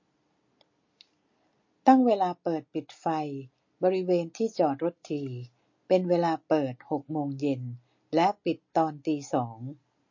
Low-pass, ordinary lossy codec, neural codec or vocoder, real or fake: 7.2 kHz; MP3, 32 kbps; none; real